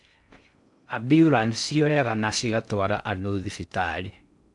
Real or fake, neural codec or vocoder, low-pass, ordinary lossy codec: fake; codec, 16 kHz in and 24 kHz out, 0.6 kbps, FocalCodec, streaming, 4096 codes; 10.8 kHz; none